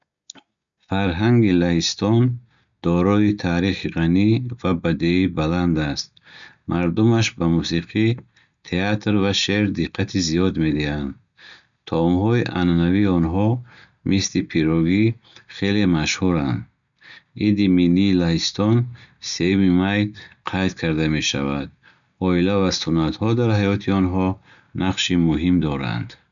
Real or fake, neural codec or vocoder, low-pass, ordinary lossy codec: real; none; 7.2 kHz; none